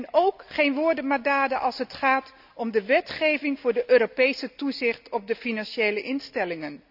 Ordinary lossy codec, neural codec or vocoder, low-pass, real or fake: none; none; 5.4 kHz; real